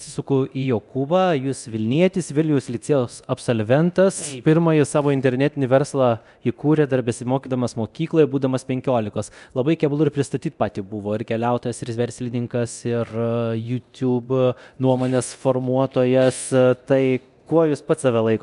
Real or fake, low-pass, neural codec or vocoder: fake; 10.8 kHz; codec, 24 kHz, 0.9 kbps, DualCodec